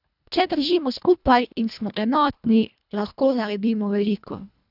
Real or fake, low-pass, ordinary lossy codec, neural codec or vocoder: fake; 5.4 kHz; none; codec, 24 kHz, 1.5 kbps, HILCodec